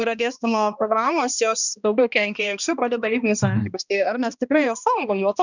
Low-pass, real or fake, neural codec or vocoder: 7.2 kHz; fake; codec, 16 kHz, 1 kbps, X-Codec, HuBERT features, trained on balanced general audio